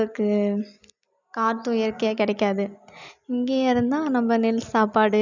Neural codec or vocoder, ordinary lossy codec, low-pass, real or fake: none; none; 7.2 kHz; real